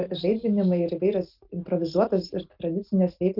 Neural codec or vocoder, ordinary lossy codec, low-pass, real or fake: none; Opus, 32 kbps; 5.4 kHz; real